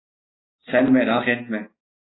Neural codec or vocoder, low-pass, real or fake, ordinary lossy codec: none; 7.2 kHz; real; AAC, 16 kbps